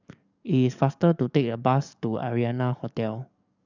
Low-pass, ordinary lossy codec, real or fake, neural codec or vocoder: 7.2 kHz; none; fake; codec, 44.1 kHz, 7.8 kbps, DAC